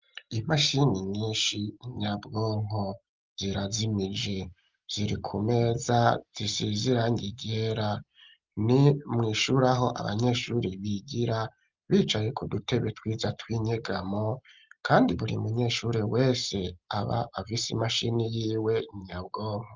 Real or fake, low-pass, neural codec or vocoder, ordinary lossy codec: real; 7.2 kHz; none; Opus, 32 kbps